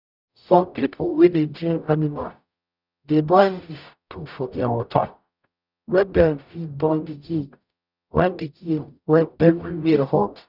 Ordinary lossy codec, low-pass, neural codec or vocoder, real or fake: none; 5.4 kHz; codec, 44.1 kHz, 0.9 kbps, DAC; fake